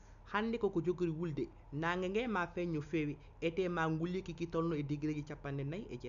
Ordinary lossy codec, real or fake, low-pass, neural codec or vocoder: none; real; 7.2 kHz; none